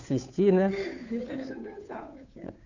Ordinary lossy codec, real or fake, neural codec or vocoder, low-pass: Opus, 64 kbps; fake; codec, 16 kHz, 2 kbps, FunCodec, trained on Chinese and English, 25 frames a second; 7.2 kHz